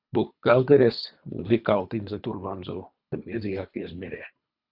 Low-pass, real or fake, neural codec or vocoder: 5.4 kHz; fake; codec, 24 kHz, 3 kbps, HILCodec